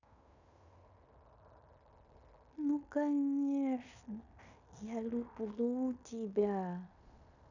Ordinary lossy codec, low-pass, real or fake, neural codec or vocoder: none; 7.2 kHz; fake; codec, 16 kHz in and 24 kHz out, 0.9 kbps, LongCat-Audio-Codec, fine tuned four codebook decoder